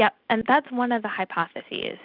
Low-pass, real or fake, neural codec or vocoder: 5.4 kHz; real; none